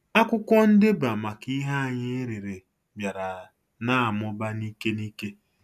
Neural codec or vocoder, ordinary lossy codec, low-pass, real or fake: none; none; 14.4 kHz; real